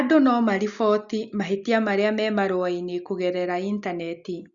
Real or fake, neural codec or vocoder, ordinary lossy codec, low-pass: real; none; Opus, 64 kbps; 7.2 kHz